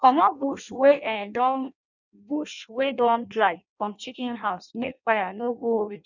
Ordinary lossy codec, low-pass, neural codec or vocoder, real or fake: none; 7.2 kHz; codec, 16 kHz in and 24 kHz out, 0.6 kbps, FireRedTTS-2 codec; fake